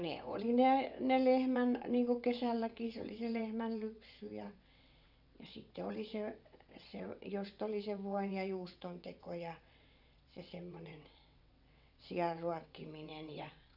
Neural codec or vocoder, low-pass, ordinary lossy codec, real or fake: vocoder, 22.05 kHz, 80 mel bands, WaveNeXt; 5.4 kHz; none; fake